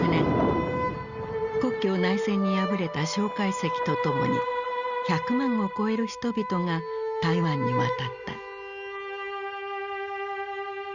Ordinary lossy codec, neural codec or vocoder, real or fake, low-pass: Opus, 64 kbps; none; real; 7.2 kHz